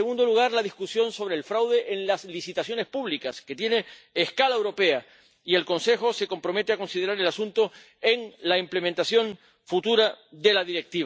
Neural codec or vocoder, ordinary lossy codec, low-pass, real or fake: none; none; none; real